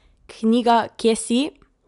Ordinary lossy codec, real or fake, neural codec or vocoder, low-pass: none; real; none; 10.8 kHz